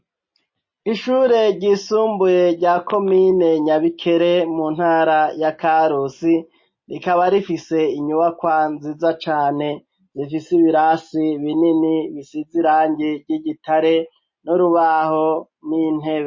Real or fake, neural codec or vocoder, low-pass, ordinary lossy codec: real; none; 7.2 kHz; MP3, 32 kbps